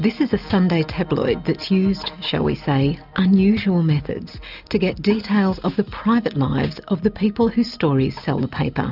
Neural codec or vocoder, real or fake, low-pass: none; real; 5.4 kHz